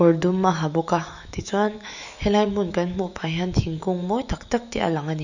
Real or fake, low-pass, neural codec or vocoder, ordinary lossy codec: fake; 7.2 kHz; autoencoder, 48 kHz, 128 numbers a frame, DAC-VAE, trained on Japanese speech; none